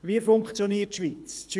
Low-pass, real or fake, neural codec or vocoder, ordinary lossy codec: none; fake; codec, 24 kHz, 6 kbps, HILCodec; none